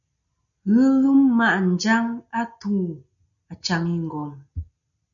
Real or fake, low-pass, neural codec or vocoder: real; 7.2 kHz; none